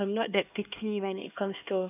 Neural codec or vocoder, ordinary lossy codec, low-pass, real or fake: codec, 16 kHz, 2 kbps, X-Codec, HuBERT features, trained on LibriSpeech; none; 3.6 kHz; fake